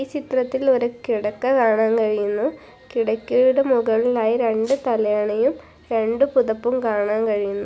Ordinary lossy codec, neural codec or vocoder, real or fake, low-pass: none; none; real; none